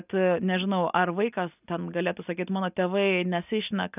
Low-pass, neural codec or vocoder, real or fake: 3.6 kHz; none; real